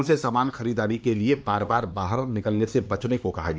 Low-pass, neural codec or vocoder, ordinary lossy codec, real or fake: none; codec, 16 kHz, 2 kbps, X-Codec, HuBERT features, trained on LibriSpeech; none; fake